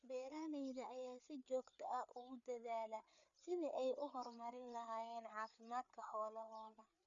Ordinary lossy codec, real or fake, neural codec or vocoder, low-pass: none; fake; codec, 16 kHz, 4 kbps, FreqCodec, larger model; 7.2 kHz